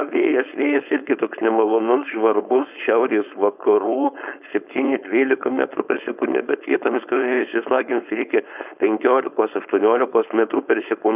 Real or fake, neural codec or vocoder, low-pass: fake; codec, 16 kHz, 4.8 kbps, FACodec; 3.6 kHz